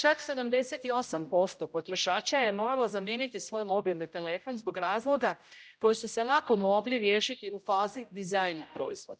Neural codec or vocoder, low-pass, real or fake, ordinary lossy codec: codec, 16 kHz, 0.5 kbps, X-Codec, HuBERT features, trained on general audio; none; fake; none